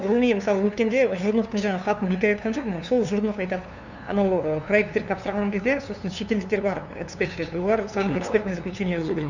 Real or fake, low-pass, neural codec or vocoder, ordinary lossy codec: fake; 7.2 kHz; codec, 16 kHz, 2 kbps, FunCodec, trained on LibriTTS, 25 frames a second; none